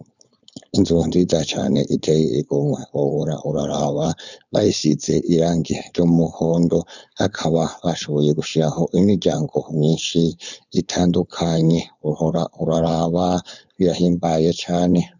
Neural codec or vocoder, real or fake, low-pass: codec, 16 kHz, 4.8 kbps, FACodec; fake; 7.2 kHz